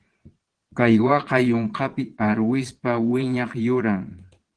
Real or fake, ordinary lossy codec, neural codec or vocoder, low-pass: fake; Opus, 16 kbps; vocoder, 22.05 kHz, 80 mel bands, Vocos; 9.9 kHz